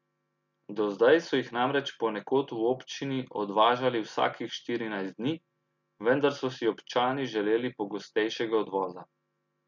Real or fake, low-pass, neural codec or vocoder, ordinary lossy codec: real; 7.2 kHz; none; none